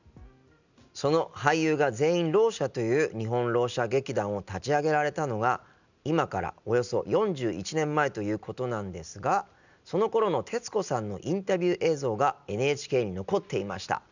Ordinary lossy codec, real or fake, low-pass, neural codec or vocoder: none; real; 7.2 kHz; none